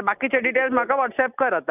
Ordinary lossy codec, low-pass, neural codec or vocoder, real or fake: none; 3.6 kHz; none; real